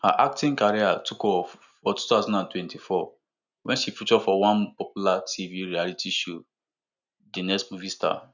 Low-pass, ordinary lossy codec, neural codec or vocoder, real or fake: 7.2 kHz; none; none; real